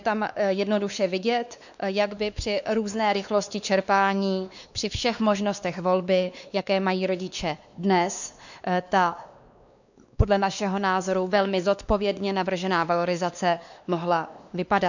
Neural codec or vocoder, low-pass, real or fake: codec, 16 kHz, 2 kbps, X-Codec, WavLM features, trained on Multilingual LibriSpeech; 7.2 kHz; fake